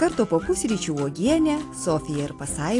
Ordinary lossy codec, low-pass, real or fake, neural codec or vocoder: AAC, 48 kbps; 10.8 kHz; real; none